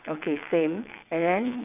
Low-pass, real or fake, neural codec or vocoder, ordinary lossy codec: 3.6 kHz; fake; vocoder, 22.05 kHz, 80 mel bands, WaveNeXt; none